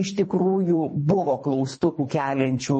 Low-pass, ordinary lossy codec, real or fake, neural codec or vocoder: 10.8 kHz; MP3, 32 kbps; fake; codec, 24 kHz, 3 kbps, HILCodec